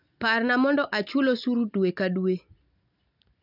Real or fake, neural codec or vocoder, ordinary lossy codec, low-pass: real; none; none; 5.4 kHz